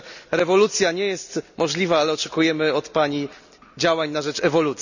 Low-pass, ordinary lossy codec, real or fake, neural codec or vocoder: 7.2 kHz; none; real; none